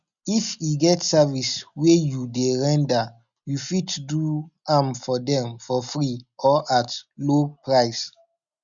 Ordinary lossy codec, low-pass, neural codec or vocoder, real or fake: none; 7.2 kHz; none; real